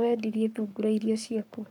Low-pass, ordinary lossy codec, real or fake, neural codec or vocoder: 19.8 kHz; none; fake; codec, 44.1 kHz, 7.8 kbps, Pupu-Codec